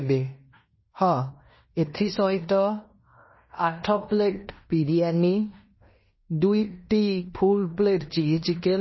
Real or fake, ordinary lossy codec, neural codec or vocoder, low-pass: fake; MP3, 24 kbps; codec, 16 kHz in and 24 kHz out, 0.9 kbps, LongCat-Audio-Codec, fine tuned four codebook decoder; 7.2 kHz